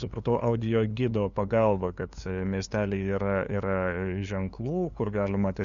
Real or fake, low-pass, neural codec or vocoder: fake; 7.2 kHz; codec, 16 kHz, 2 kbps, FunCodec, trained on LibriTTS, 25 frames a second